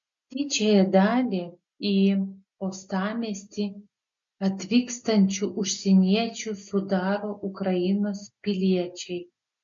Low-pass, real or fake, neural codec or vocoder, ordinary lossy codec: 7.2 kHz; real; none; MP3, 48 kbps